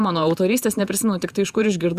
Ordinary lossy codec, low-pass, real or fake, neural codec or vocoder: Opus, 64 kbps; 14.4 kHz; fake; vocoder, 48 kHz, 128 mel bands, Vocos